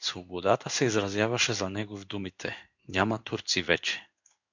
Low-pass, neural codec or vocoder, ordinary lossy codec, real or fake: 7.2 kHz; codec, 16 kHz in and 24 kHz out, 1 kbps, XY-Tokenizer; MP3, 64 kbps; fake